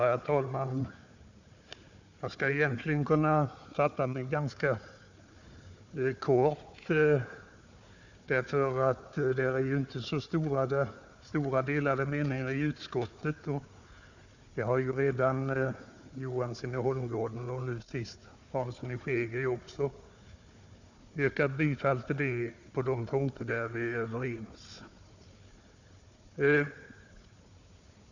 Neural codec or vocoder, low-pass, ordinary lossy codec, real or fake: codec, 16 kHz, 4 kbps, FunCodec, trained on Chinese and English, 50 frames a second; 7.2 kHz; none; fake